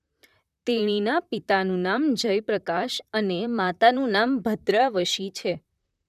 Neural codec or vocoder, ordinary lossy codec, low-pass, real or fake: vocoder, 44.1 kHz, 128 mel bands, Pupu-Vocoder; none; 14.4 kHz; fake